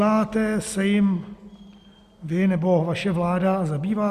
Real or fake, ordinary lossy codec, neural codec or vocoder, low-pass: real; Opus, 64 kbps; none; 14.4 kHz